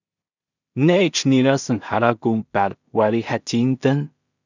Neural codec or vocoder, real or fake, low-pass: codec, 16 kHz in and 24 kHz out, 0.4 kbps, LongCat-Audio-Codec, two codebook decoder; fake; 7.2 kHz